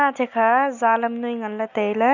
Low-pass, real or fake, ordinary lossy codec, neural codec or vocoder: 7.2 kHz; real; none; none